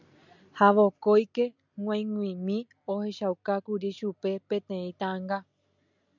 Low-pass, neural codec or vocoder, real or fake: 7.2 kHz; none; real